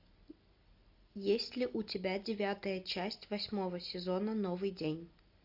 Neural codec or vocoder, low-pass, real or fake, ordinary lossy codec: none; 5.4 kHz; real; AAC, 48 kbps